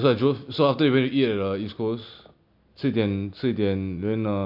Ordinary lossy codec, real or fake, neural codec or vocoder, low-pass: MP3, 48 kbps; real; none; 5.4 kHz